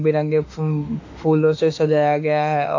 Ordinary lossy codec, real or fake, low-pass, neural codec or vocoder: AAC, 48 kbps; fake; 7.2 kHz; autoencoder, 48 kHz, 32 numbers a frame, DAC-VAE, trained on Japanese speech